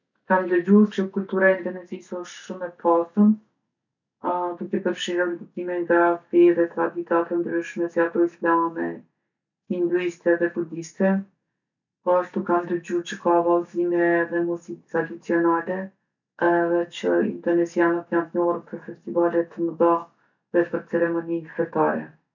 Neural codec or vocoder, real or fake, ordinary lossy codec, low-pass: none; real; none; 7.2 kHz